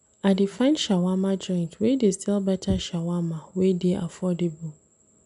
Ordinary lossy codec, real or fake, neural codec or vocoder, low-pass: none; real; none; 10.8 kHz